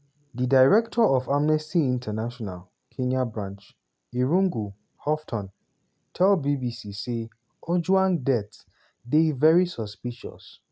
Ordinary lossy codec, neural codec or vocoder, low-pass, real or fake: none; none; none; real